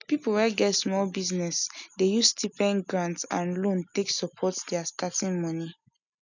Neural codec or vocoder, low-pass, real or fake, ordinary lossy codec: none; 7.2 kHz; real; none